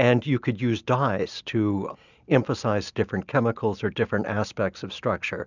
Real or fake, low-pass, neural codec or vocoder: real; 7.2 kHz; none